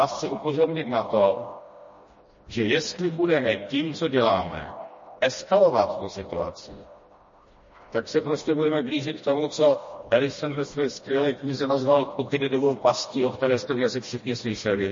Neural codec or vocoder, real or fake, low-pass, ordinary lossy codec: codec, 16 kHz, 1 kbps, FreqCodec, smaller model; fake; 7.2 kHz; MP3, 32 kbps